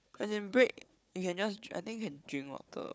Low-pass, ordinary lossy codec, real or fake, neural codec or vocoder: none; none; fake; codec, 16 kHz, 4 kbps, FunCodec, trained on Chinese and English, 50 frames a second